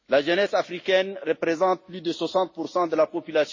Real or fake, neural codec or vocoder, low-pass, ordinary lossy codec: real; none; 7.2 kHz; MP3, 32 kbps